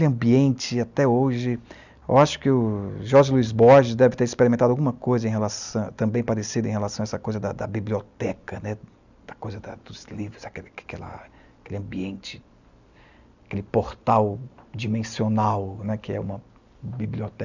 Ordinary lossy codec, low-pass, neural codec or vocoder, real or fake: none; 7.2 kHz; none; real